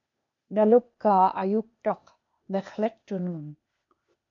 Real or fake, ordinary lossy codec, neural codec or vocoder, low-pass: fake; MP3, 64 kbps; codec, 16 kHz, 0.8 kbps, ZipCodec; 7.2 kHz